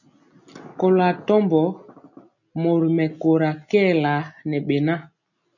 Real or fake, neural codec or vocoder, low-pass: real; none; 7.2 kHz